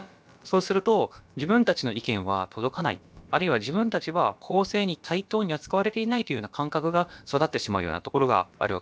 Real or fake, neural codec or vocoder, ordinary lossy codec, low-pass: fake; codec, 16 kHz, about 1 kbps, DyCAST, with the encoder's durations; none; none